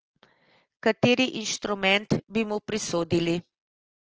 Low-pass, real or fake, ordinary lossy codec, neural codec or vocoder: 7.2 kHz; real; Opus, 16 kbps; none